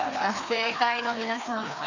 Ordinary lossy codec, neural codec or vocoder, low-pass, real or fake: none; codec, 16 kHz, 2 kbps, FreqCodec, larger model; 7.2 kHz; fake